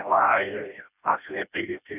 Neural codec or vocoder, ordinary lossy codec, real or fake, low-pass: codec, 16 kHz, 1 kbps, FreqCodec, smaller model; none; fake; 3.6 kHz